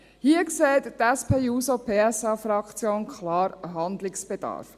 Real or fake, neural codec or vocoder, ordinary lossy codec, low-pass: real; none; Opus, 64 kbps; 14.4 kHz